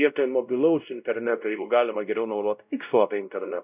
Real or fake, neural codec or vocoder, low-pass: fake; codec, 16 kHz, 0.5 kbps, X-Codec, WavLM features, trained on Multilingual LibriSpeech; 3.6 kHz